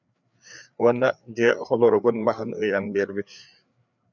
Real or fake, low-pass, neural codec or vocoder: fake; 7.2 kHz; codec, 16 kHz, 4 kbps, FreqCodec, larger model